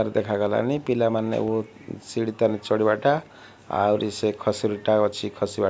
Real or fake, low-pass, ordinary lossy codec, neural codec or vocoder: real; none; none; none